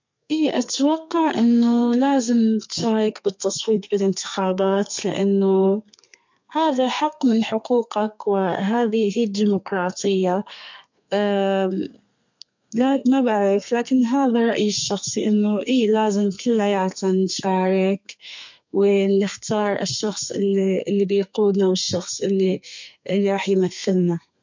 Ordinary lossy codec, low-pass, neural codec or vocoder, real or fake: MP3, 48 kbps; 7.2 kHz; codec, 32 kHz, 1.9 kbps, SNAC; fake